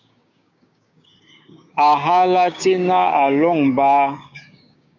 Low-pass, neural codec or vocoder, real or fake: 7.2 kHz; codec, 16 kHz, 6 kbps, DAC; fake